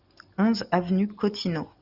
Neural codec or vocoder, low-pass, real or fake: none; 5.4 kHz; real